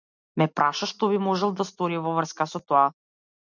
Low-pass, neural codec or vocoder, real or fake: 7.2 kHz; none; real